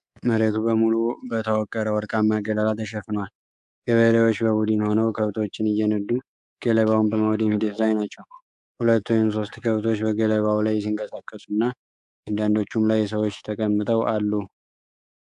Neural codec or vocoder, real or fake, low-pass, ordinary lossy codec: codec, 24 kHz, 3.1 kbps, DualCodec; fake; 10.8 kHz; AAC, 96 kbps